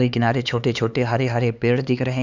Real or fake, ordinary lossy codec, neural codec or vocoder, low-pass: fake; none; codec, 16 kHz, 4 kbps, X-Codec, HuBERT features, trained on LibriSpeech; 7.2 kHz